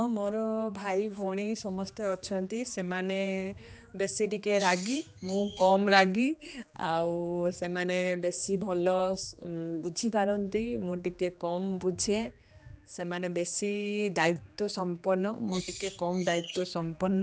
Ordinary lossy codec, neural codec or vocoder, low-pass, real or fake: none; codec, 16 kHz, 2 kbps, X-Codec, HuBERT features, trained on general audio; none; fake